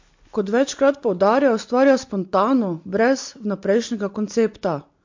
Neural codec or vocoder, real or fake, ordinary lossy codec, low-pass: none; real; MP3, 48 kbps; 7.2 kHz